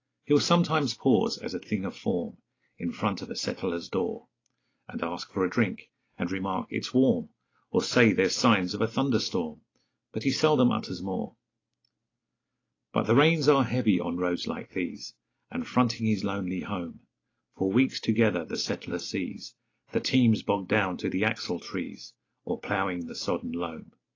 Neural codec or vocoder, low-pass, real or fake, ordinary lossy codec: none; 7.2 kHz; real; AAC, 32 kbps